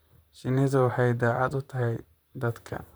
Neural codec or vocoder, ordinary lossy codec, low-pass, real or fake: vocoder, 44.1 kHz, 128 mel bands, Pupu-Vocoder; none; none; fake